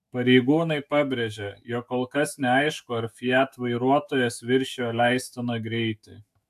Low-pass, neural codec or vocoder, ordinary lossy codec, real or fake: 14.4 kHz; autoencoder, 48 kHz, 128 numbers a frame, DAC-VAE, trained on Japanese speech; AAC, 96 kbps; fake